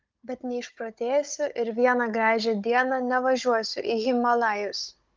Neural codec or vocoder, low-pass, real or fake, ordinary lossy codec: codec, 16 kHz, 16 kbps, FunCodec, trained on Chinese and English, 50 frames a second; 7.2 kHz; fake; Opus, 24 kbps